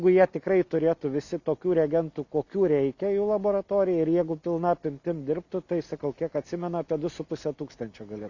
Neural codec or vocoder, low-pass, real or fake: none; 7.2 kHz; real